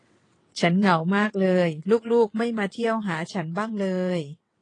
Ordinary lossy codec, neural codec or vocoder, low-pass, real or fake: AAC, 32 kbps; vocoder, 22.05 kHz, 80 mel bands, WaveNeXt; 9.9 kHz; fake